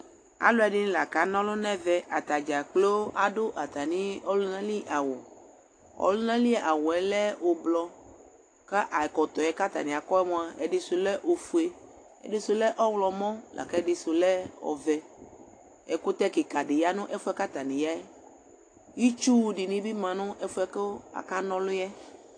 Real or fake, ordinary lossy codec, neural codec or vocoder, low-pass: real; AAC, 48 kbps; none; 9.9 kHz